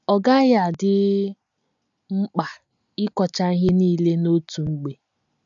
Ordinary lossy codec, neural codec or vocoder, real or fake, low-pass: none; none; real; 7.2 kHz